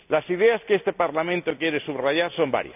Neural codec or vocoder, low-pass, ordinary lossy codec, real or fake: none; 3.6 kHz; none; real